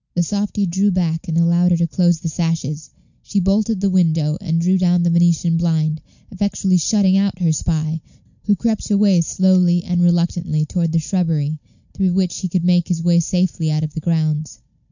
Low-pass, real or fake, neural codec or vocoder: 7.2 kHz; real; none